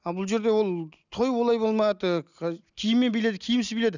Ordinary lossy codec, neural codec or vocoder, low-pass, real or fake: none; none; 7.2 kHz; real